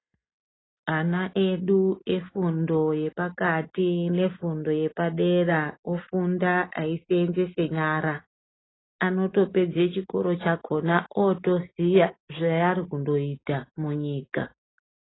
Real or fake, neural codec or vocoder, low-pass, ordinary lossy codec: real; none; 7.2 kHz; AAC, 16 kbps